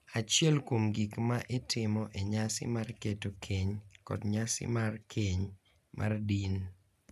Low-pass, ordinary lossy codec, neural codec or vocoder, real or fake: 14.4 kHz; none; none; real